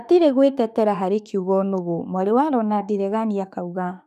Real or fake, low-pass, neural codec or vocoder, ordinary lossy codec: fake; 14.4 kHz; autoencoder, 48 kHz, 32 numbers a frame, DAC-VAE, trained on Japanese speech; none